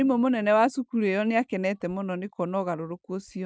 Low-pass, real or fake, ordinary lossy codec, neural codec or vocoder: none; real; none; none